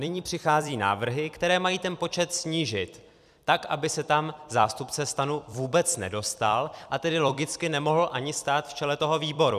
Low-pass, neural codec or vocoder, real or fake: 14.4 kHz; vocoder, 44.1 kHz, 128 mel bands every 256 samples, BigVGAN v2; fake